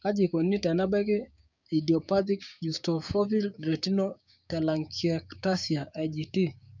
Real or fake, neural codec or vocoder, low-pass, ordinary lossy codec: fake; codec, 16 kHz, 6 kbps, DAC; 7.2 kHz; none